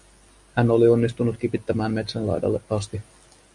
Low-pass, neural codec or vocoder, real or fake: 10.8 kHz; none; real